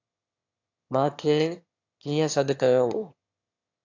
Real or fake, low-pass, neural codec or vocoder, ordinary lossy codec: fake; 7.2 kHz; autoencoder, 22.05 kHz, a latent of 192 numbers a frame, VITS, trained on one speaker; AAC, 48 kbps